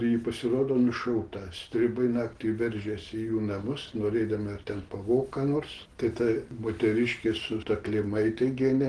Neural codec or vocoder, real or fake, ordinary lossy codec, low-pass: none; real; Opus, 16 kbps; 9.9 kHz